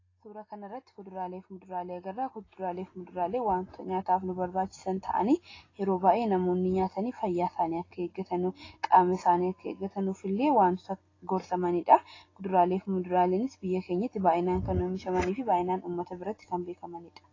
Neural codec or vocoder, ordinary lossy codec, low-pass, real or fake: none; AAC, 32 kbps; 7.2 kHz; real